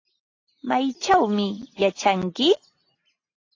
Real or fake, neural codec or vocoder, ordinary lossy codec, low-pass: real; none; AAC, 32 kbps; 7.2 kHz